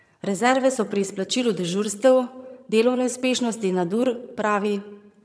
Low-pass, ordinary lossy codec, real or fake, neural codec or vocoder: none; none; fake; vocoder, 22.05 kHz, 80 mel bands, HiFi-GAN